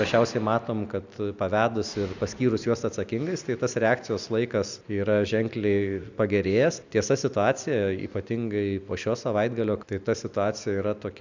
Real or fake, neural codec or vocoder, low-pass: real; none; 7.2 kHz